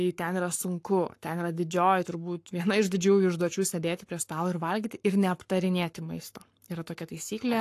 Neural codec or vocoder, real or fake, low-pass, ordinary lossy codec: codec, 44.1 kHz, 7.8 kbps, Pupu-Codec; fake; 14.4 kHz; AAC, 64 kbps